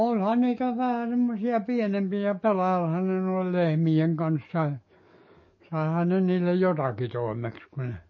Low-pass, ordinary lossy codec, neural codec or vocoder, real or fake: 7.2 kHz; MP3, 32 kbps; codec, 44.1 kHz, 7.8 kbps, DAC; fake